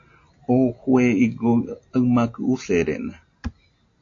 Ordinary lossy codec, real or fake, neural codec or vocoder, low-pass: AAC, 48 kbps; real; none; 7.2 kHz